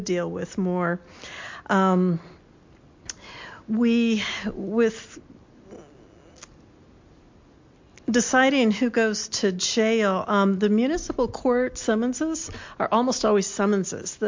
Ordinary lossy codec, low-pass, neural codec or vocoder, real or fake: MP3, 48 kbps; 7.2 kHz; none; real